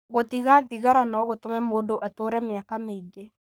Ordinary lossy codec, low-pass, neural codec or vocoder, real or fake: none; none; codec, 44.1 kHz, 3.4 kbps, Pupu-Codec; fake